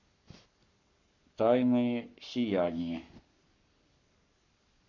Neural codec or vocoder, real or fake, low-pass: codec, 44.1 kHz, 7.8 kbps, Pupu-Codec; fake; 7.2 kHz